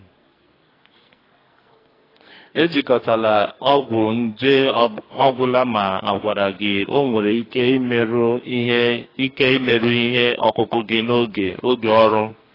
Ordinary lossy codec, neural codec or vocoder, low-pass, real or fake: AAC, 24 kbps; codec, 44.1 kHz, 2.6 kbps, SNAC; 5.4 kHz; fake